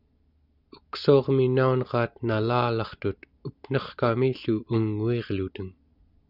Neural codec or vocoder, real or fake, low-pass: none; real; 5.4 kHz